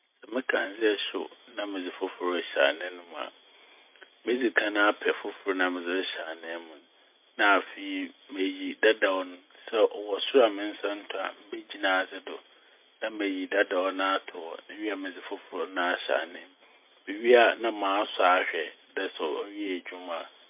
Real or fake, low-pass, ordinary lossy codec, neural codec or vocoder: real; 3.6 kHz; MP3, 32 kbps; none